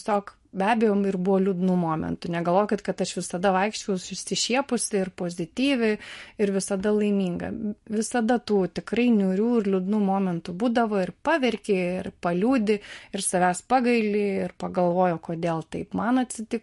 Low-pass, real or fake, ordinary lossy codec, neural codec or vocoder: 14.4 kHz; real; MP3, 48 kbps; none